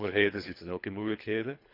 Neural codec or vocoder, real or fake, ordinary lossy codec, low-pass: codec, 24 kHz, 3 kbps, HILCodec; fake; none; 5.4 kHz